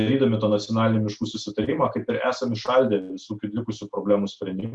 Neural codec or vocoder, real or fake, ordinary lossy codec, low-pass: none; real; Opus, 64 kbps; 10.8 kHz